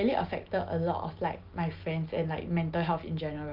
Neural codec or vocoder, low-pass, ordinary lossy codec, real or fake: none; 5.4 kHz; Opus, 24 kbps; real